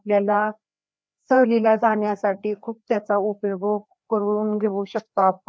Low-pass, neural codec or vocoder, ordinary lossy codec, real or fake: none; codec, 16 kHz, 2 kbps, FreqCodec, larger model; none; fake